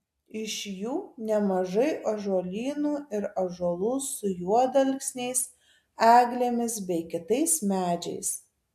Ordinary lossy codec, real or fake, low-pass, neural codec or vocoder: AAC, 96 kbps; real; 14.4 kHz; none